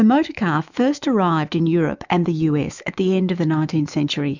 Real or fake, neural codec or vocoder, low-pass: fake; autoencoder, 48 kHz, 128 numbers a frame, DAC-VAE, trained on Japanese speech; 7.2 kHz